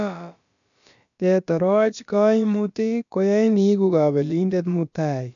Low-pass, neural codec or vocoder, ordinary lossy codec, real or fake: 7.2 kHz; codec, 16 kHz, about 1 kbps, DyCAST, with the encoder's durations; none; fake